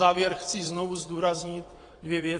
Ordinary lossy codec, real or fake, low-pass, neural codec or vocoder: AAC, 32 kbps; fake; 9.9 kHz; vocoder, 22.05 kHz, 80 mel bands, WaveNeXt